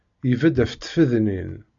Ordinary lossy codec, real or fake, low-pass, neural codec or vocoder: AAC, 64 kbps; real; 7.2 kHz; none